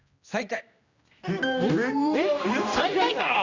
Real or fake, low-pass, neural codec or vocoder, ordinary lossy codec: fake; 7.2 kHz; codec, 16 kHz, 1 kbps, X-Codec, HuBERT features, trained on general audio; Opus, 64 kbps